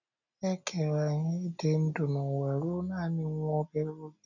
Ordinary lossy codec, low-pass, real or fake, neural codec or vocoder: none; 7.2 kHz; real; none